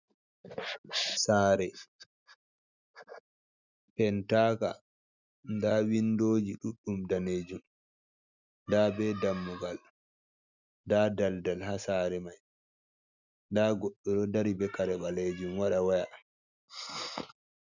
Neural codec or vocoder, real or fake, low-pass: none; real; 7.2 kHz